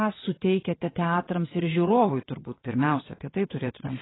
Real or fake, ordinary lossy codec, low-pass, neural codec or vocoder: fake; AAC, 16 kbps; 7.2 kHz; vocoder, 22.05 kHz, 80 mel bands, WaveNeXt